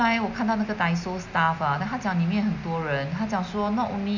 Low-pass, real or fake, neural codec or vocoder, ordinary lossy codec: 7.2 kHz; real; none; none